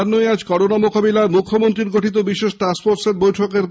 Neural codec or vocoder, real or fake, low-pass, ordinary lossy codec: none; real; none; none